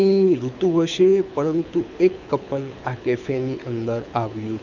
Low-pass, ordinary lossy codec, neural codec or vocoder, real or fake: 7.2 kHz; none; codec, 24 kHz, 6 kbps, HILCodec; fake